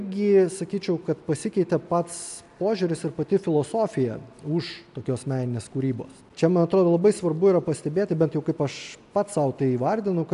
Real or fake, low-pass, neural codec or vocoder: real; 10.8 kHz; none